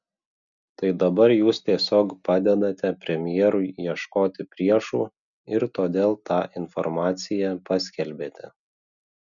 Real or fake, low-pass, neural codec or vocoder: real; 7.2 kHz; none